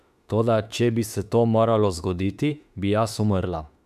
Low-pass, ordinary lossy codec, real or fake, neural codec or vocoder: 14.4 kHz; none; fake; autoencoder, 48 kHz, 32 numbers a frame, DAC-VAE, trained on Japanese speech